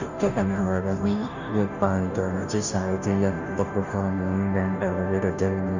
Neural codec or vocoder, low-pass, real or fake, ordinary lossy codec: codec, 16 kHz, 0.5 kbps, FunCodec, trained on Chinese and English, 25 frames a second; 7.2 kHz; fake; none